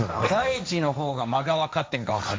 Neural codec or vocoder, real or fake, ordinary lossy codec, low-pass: codec, 16 kHz, 1.1 kbps, Voila-Tokenizer; fake; none; none